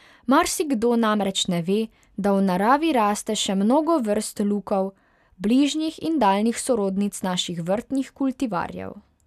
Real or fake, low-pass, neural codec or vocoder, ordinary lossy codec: real; 14.4 kHz; none; none